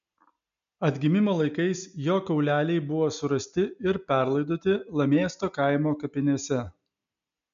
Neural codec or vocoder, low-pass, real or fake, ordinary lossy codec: none; 7.2 kHz; real; MP3, 96 kbps